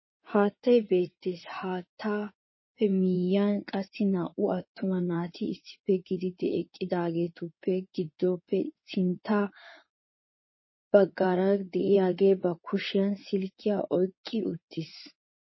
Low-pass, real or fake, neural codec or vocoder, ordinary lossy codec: 7.2 kHz; fake; codec, 16 kHz in and 24 kHz out, 2.2 kbps, FireRedTTS-2 codec; MP3, 24 kbps